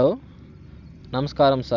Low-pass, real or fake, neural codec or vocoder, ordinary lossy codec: 7.2 kHz; real; none; none